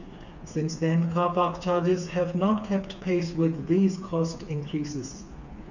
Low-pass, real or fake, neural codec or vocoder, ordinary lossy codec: 7.2 kHz; fake; codec, 16 kHz, 4 kbps, FreqCodec, smaller model; none